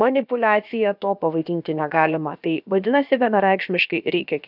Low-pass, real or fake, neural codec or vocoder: 5.4 kHz; fake; codec, 16 kHz, about 1 kbps, DyCAST, with the encoder's durations